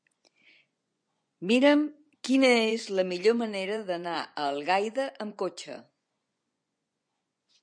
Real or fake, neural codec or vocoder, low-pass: fake; vocoder, 24 kHz, 100 mel bands, Vocos; 9.9 kHz